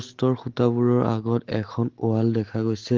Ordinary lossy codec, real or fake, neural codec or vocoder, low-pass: Opus, 16 kbps; real; none; 7.2 kHz